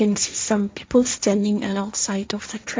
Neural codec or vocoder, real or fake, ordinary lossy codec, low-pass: codec, 16 kHz, 1.1 kbps, Voila-Tokenizer; fake; none; none